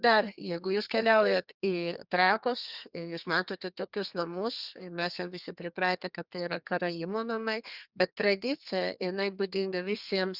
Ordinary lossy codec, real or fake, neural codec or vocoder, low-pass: Opus, 64 kbps; fake; codec, 32 kHz, 1.9 kbps, SNAC; 5.4 kHz